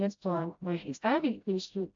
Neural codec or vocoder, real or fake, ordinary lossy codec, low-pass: codec, 16 kHz, 0.5 kbps, FreqCodec, smaller model; fake; AAC, 48 kbps; 7.2 kHz